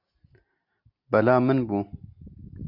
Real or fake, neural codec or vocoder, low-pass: real; none; 5.4 kHz